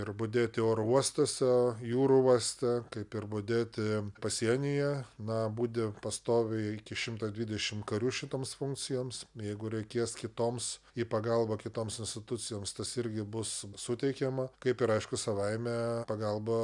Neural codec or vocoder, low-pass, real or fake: none; 10.8 kHz; real